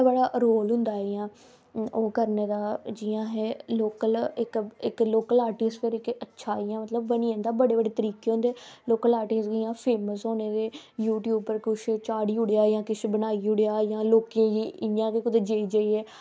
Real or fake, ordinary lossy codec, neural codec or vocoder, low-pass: real; none; none; none